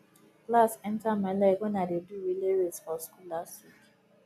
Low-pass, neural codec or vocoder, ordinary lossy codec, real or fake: 14.4 kHz; none; none; real